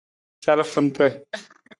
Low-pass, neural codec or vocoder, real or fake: 10.8 kHz; codec, 44.1 kHz, 3.4 kbps, Pupu-Codec; fake